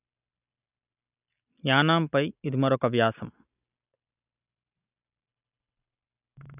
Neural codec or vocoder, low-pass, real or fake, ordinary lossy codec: none; 3.6 kHz; real; none